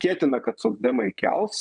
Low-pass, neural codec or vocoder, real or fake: 9.9 kHz; vocoder, 22.05 kHz, 80 mel bands, WaveNeXt; fake